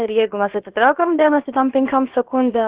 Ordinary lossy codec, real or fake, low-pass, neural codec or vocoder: Opus, 16 kbps; fake; 3.6 kHz; codec, 16 kHz, about 1 kbps, DyCAST, with the encoder's durations